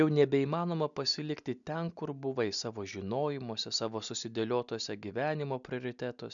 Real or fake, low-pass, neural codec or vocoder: real; 7.2 kHz; none